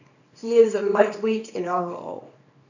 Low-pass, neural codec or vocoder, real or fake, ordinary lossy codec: 7.2 kHz; codec, 24 kHz, 0.9 kbps, WavTokenizer, small release; fake; none